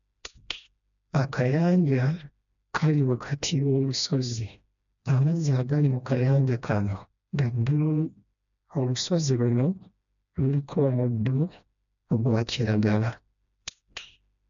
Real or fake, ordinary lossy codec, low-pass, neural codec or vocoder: fake; none; 7.2 kHz; codec, 16 kHz, 1 kbps, FreqCodec, smaller model